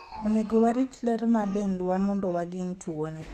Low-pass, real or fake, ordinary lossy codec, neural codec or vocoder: 14.4 kHz; fake; none; codec, 32 kHz, 1.9 kbps, SNAC